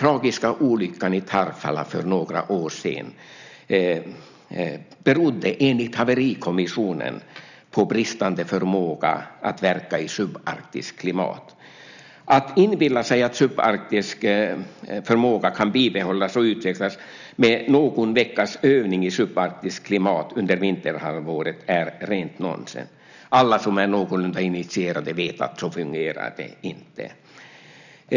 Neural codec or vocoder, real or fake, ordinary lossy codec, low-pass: none; real; none; 7.2 kHz